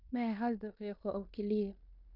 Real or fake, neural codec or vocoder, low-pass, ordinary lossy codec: fake; codec, 16 kHz in and 24 kHz out, 0.9 kbps, LongCat-Audio-Codec, fine tuned four codebook decoder; 5.4 kHz; none